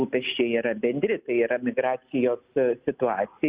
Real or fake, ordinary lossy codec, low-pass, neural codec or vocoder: real; Opus, 64 kbps; 3.6 kHz; none